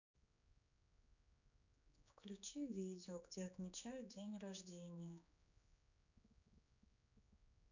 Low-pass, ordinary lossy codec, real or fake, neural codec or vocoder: 7.2 kHz; none; fake; codec, 16 kHz, 4 kbps, X-Codec, HuBERT features, trained on general audio